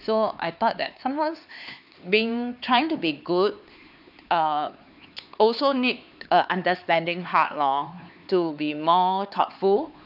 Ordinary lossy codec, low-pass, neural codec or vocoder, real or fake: none; 5.4 kHz; codec, 16 kHz, 4 kbps, X-Codec, HuBERT features, trained on LibriSpeech; fake